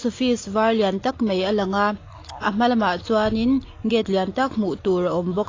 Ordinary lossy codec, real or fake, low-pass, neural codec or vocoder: AAC, 32 kbps; real; 7.2 kHz; none